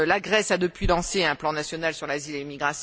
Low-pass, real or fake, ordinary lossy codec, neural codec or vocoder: none; real; none; none